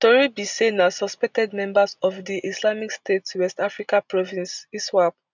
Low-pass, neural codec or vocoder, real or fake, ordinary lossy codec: 7.2 kHz; none; real; none